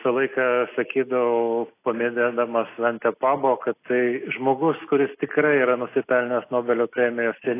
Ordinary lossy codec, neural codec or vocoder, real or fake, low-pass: AAC, 24 kbps; none; real; 3.6 kHz